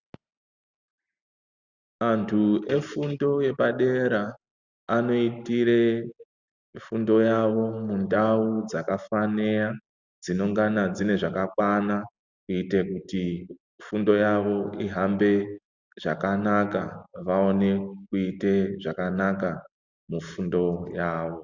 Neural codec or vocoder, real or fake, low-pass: none; real; 7.2 kHz